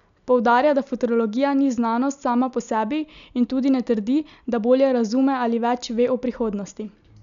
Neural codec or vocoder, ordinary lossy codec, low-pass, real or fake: none; MP3, 96 kbps; 7.2 kHz; real